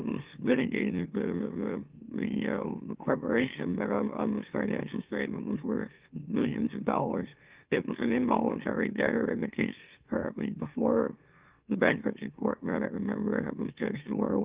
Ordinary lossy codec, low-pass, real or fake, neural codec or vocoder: Opus, 32 kbps; 3.6 kHz; fake; autoencoder, 44.1 kHz, a latent of 192 numbers a frame, MeloTTS